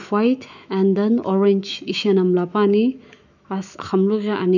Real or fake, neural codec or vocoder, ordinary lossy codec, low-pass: fake; autoencoder, 48 kHz, 128 numbers a frame, DAC-VAE, trained on Japanese speech; none; 7.2 kHz